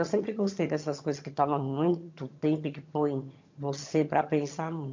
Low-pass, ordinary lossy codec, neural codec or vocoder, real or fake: 7.2 kHz; MP3, 48 kbps; vocoder, 22.05 kHz, 80 mel bands, HiFi-GAN; fake